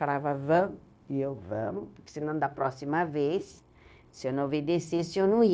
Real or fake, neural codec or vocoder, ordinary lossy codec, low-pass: fake; codec, 16 kHz, 0.9 kbps, LongCat-Audio-Codec; none; none